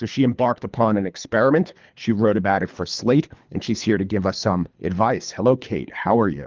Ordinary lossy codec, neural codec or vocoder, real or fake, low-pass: Opus, 24 kbps; codec, 24 kHz, 3 kbps, HILCodec; fake; 7.2 kHz